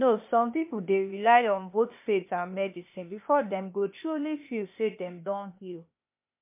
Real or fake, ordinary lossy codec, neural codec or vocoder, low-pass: fake; MP3, 32 kbps; codec, 16 kHz, 0.8 kbps, ZipCodec; 3.6 kHz